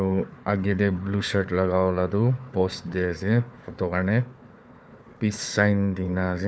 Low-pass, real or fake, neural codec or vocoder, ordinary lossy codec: none; fake; codec, 16 kHz, 4 kbps, FunCodec, trained on Chinese and English, 50 frames a second; none